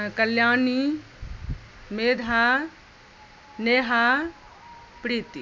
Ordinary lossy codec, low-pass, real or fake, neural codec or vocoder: none; none; real; none